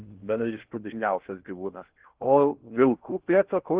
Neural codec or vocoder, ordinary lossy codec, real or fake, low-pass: codec, 16 kHz in and 24 kHz out, 0.6 kbps, FocalCodec, streaming, 2048 codes; Opus, 16 kbps; fake; 3.6 kHz